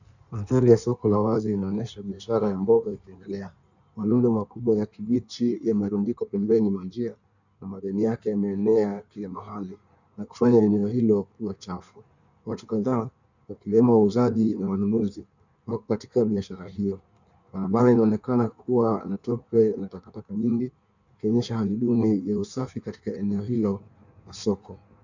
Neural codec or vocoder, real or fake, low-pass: codec, 16 kHz in and 24 kHz out, 1.1 kbps, FireRedTTS-2 codec; fake; 7.2 kHz